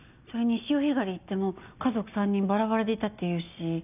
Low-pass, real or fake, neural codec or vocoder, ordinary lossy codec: 3.6 kHz; real; none; none